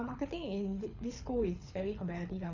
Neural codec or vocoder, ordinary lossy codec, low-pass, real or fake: codec, 24 kHz, 6 kbps, HILCodec; none; 7.2 kHz; fake